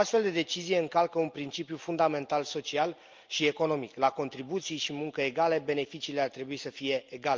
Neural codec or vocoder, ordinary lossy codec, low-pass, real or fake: none; Opus, 24 kbps; 7.2 kHz; real